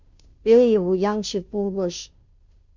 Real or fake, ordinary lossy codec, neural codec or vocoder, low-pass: fake; Opus, 64 kbps; codec, 16 kHz, 0.5 kbps, FunCodec, trained on Chinese and English, 25 frames a second; 7.2 kHz